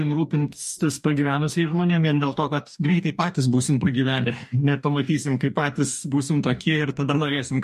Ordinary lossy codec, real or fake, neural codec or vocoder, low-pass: MP3, 64 kbps; fake; codec, 44.1 kHz, 2.6 kbps, DAC; 14.4 kHz